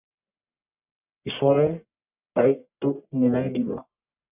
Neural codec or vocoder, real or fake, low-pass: codec, 44.1 kHz, 1.7 kbps, Pupu-Codec; fake; 3.6 kHz